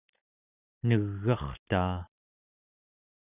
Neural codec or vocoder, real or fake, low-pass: none; real; 3.6 kHz